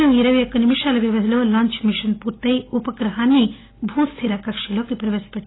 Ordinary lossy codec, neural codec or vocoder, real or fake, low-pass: AAC, 16 kbps; none; real; 7.2 kHz